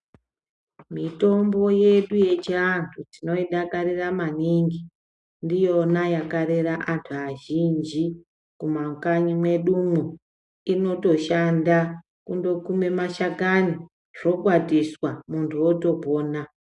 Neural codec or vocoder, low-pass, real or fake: none; 10.8 kHz; real